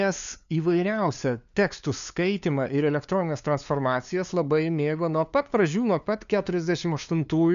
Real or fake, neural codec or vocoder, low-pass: fake; codec, 16 kHz, 2 kbps, FunCodec, trained on LibriTTS, 25 frames a second; 7.2 kHz